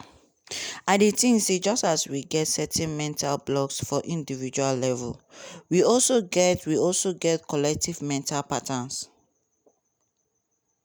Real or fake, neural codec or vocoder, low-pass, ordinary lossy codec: real; none; none; none